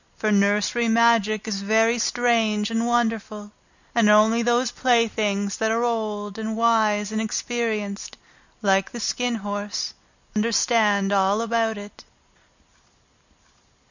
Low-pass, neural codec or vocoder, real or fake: 7.2 kHz; none; real